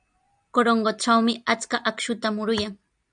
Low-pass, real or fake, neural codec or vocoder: 9.9 kHz; real; none